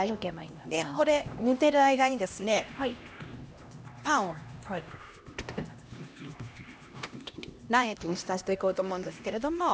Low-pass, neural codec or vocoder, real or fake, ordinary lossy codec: none; codec, 16 kHz, 1 kbps, X-Codec, HuBERT features, trained on LibriSpeech; fake; none